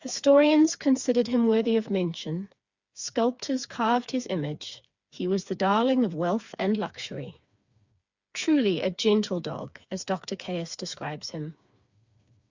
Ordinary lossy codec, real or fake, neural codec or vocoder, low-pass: Opus, 64 kbps; fake; codec, 16 kHz, 4 kbps, FreqCodec, smaller model; 7.2 kHz